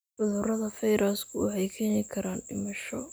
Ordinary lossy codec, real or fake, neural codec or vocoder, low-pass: none; real; none; none